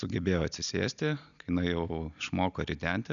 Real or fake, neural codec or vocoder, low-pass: real; none; 7.2 kHz